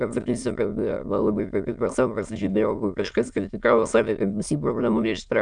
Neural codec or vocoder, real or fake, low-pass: autoencoder, 22.05 kHz, a latent of 192 numbers a frame, VITS, trained on many speakers; fake; 9.9 kHz